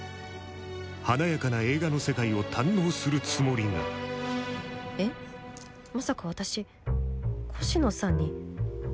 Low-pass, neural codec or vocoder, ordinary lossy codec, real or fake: none; none; none; real